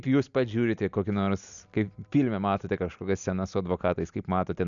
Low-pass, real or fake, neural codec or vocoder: 7.2 kHz; real; none